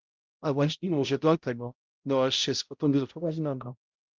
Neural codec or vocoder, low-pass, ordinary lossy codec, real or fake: codec, 16 kHz, 0.5 kbps, X-Codec, HuBERT features, trained on balanced general audio; 7.2 kHz; Opus, 24 kbps; fake